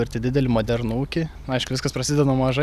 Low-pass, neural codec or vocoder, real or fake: 14.4 kHz; none; real